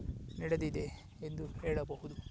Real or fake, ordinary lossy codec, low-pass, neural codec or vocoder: real; none; none; none